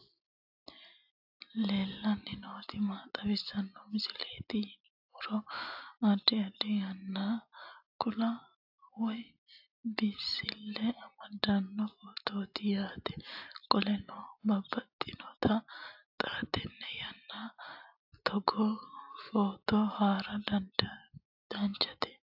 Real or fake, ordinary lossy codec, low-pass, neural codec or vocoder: real; AAC, 48 kbps; 5.4 kHz; none